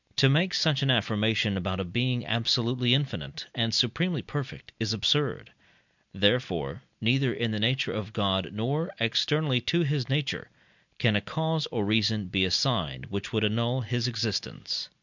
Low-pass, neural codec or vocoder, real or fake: 7.2 kHz; none; real